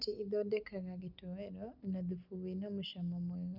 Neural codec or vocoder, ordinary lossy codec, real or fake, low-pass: none; none; real; 5.4 kHz